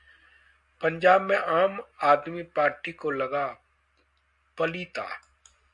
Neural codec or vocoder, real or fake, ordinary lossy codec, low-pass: none; real; Opus, 64 kbps; 10.8 kHz